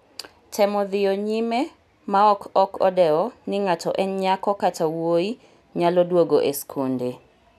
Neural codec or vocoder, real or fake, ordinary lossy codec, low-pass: none; real; none; 14.4 kHz